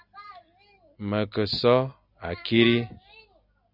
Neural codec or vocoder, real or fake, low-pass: none; real; 5.4 kHz